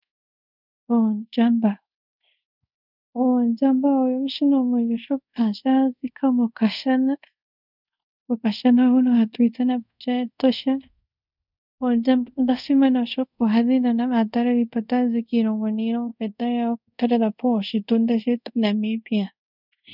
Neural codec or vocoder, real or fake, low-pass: codec, 24 kHz, 0.5 kbps, DualCodec; fake; 5.4 kHz